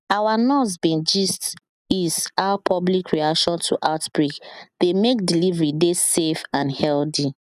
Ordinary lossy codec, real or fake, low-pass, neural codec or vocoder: none; real; 14.4 kHz; none